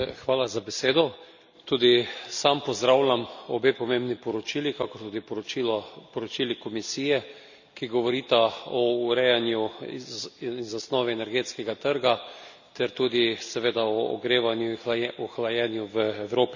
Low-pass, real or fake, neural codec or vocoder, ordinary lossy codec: 7.2 kHz; real; none; none